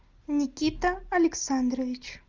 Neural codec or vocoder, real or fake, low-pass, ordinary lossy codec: none; real; 7.2 kHz; Opus, 32 kbps